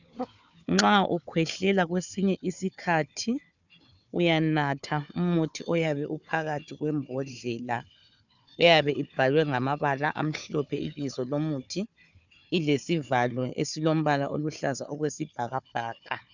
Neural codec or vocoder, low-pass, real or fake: codec, 16 kHz, 4 kbps, FunCodec, trained on Chinese and English, 50 frames a second; 7.2 kHz; fake